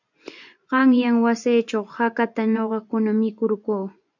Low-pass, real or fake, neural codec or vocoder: 7.2 kHz; fake; vocoder, 24 kHz, 100 mel bands, Vocos